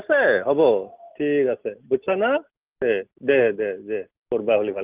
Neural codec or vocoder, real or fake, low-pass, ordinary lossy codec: none; real; 3.6 kHz; Opus, 32 kbps